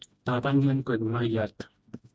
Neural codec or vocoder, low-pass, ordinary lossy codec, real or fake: codec, 16 kHz, 1 kbps, FreqCodec, smaller model; none; none; fake